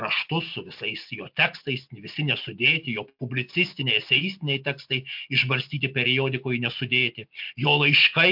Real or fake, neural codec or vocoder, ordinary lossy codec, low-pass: real; none; MP3, 48 kbps; 5.4 kHz